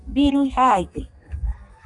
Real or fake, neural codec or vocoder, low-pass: fake; codec, 32 kHz, 1.9 kbps, SNAC; 10.8 kHz